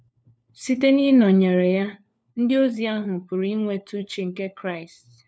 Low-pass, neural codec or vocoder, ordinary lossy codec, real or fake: none; codec, 16 kHz, 4 kbps, FunCodec, trained on LibriTTS, 50 frames a second; none; fake